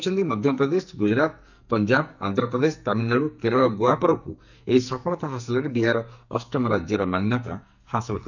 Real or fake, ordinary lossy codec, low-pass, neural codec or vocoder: fake; none; 7.2 kHz; codec, 44.1 kHz, 2.6 kbps, SNAC